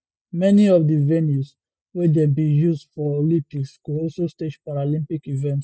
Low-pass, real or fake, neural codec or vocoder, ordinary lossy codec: none; real; none; none